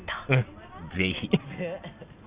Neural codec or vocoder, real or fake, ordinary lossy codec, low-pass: none; real; Opus, 64 kbps; 3.6 kHz